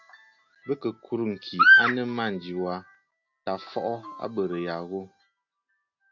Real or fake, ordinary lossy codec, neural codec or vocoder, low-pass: real; AAC, 48 kbps; none; 7.2 kHz